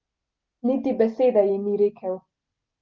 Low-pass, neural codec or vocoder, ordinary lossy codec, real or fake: 7.2 kHz; none; Opus, 32 kbps; real